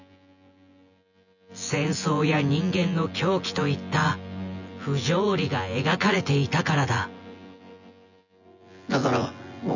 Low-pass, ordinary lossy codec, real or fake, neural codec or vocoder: 7.2 kHz; none; fake; vocoder, 24 kHz, 100 mel bands, Vocos